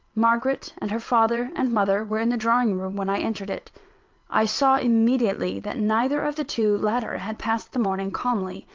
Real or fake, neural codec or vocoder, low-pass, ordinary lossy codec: fake; vocoder, 22.05 kHz, 80 mel bands, WaveNeXt; 7.2 kHz; Opus, 24 kbps